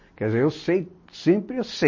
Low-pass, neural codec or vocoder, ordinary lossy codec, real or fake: 7.2 kHz; none; MP3, 32 kbps; real